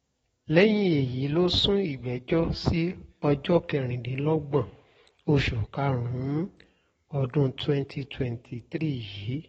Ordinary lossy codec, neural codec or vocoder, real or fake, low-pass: AAC, 24 kbps; codec, 44.1 kHz, 7.8 kbps, DAC; fake; 19.8 kHz